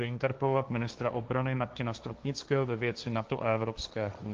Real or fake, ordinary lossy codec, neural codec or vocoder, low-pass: fake; Opus, 32 kbps; codec, 16 kHz, 1.1 kbps, Voila-Tokenizer; 7.2 kHz